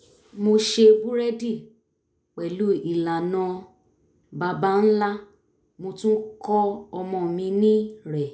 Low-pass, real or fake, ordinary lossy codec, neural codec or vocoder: none; real; none; none